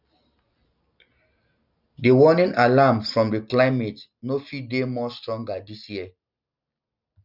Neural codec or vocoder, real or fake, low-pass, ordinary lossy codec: none; real; 5.4 kHz; none